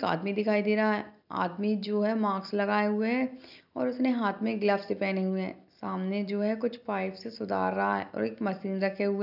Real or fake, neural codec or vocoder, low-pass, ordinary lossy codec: real; none; 5.4 kHz; none